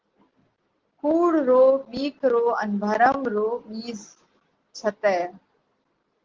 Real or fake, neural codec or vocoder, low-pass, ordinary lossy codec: real; none; 7.2 kHz; Opus, 16 kbps